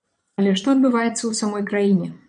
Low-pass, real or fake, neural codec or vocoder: 9.9 kHz; fake; vocoder, 22.05 kHz, 80 mel bands, Vocos